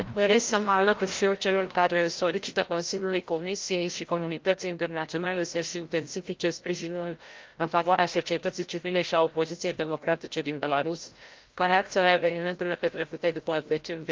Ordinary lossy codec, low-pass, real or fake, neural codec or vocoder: Opus, 32 kbps; 7.2 kHz; fake; codec, 16 kHz, 0.5 kbps, FreqCodec, larger model